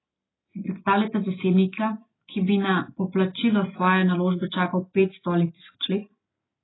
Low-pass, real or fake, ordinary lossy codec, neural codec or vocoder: 7.2 kHz; real; AAC, 16 kbps; none